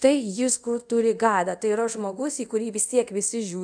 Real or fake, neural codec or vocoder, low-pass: fake; codec, 24 kHz, 0.5 kbps, DualCodec; 9.9 kHz